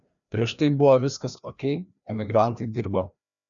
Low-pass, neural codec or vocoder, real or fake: 7.2 kHz; codec, 16 kHz, 1 kbps, FreqCodec, larger model; fake